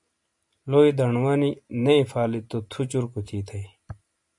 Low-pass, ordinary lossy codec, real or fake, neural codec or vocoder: 10.8 kHz; MP3, 96 kbps; real; none